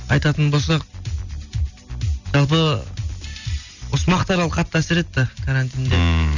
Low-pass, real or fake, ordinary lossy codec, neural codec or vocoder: 7.2 kHz; real; none; none